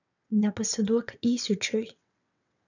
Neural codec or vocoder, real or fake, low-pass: vocoder, 22.05 kHz, 80 mel bands, WaveNeXt; fake; 7.2 kHz